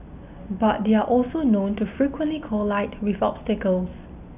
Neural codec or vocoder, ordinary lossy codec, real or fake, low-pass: none; none; real; 3.6 kHz